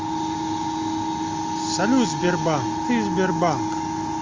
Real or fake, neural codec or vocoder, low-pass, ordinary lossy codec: real; none; 7.2 kHz; Opus, 32 kbps